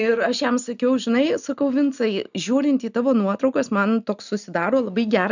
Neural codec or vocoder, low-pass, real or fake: none; 7.2 kHz; real